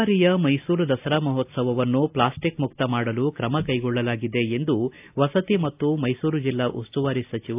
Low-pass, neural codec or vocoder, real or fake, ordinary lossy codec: 3.6 kHz; none; real; AAC, 32 kbps